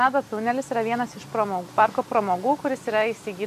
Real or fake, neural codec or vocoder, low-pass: real; none; 14.4 kHz